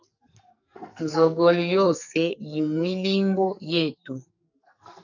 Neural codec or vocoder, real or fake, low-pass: codec, 44.1 kHz, 2.6 kbps, SNAC; fake; 7.2 kHz